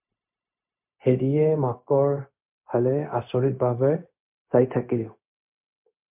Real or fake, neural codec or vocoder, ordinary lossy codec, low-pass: fake; codec, 16 kHz, 0.4 kbps, LongCat-Audio-Codec; MP3, 32 kbps; 3.6 kHz